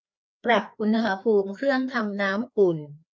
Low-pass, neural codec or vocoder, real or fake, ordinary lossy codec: none; codec, 16 kHz, 2 kbps, FreqCodec, larger model; fake; none